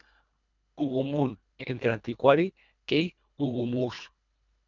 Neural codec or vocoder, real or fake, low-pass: codec, 24 kHz, 1.5 kbps, HILCodec; fake; 7.2 kHz